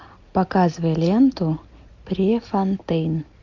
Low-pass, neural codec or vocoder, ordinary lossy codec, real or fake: 7.2 kHz; none; MP3, 64 kbps; real